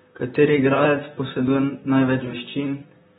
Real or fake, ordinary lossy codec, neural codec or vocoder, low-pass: fake; AAC, 16 kbps; vocoder, 44.1 kHz, 128 mel bands, Pupu-Vocoder; 19.8 kHz